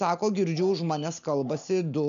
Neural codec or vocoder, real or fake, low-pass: none; real; 7.2 kHz